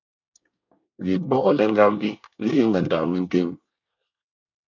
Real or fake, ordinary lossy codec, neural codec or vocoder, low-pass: fake; MP3, 64 kbps; codec, 24 kHz, 1 kbps, SNAC; 7.2 kHz